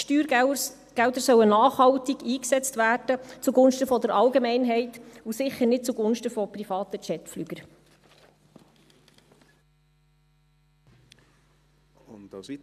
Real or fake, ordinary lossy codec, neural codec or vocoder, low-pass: real; none; none; 14.4 kHz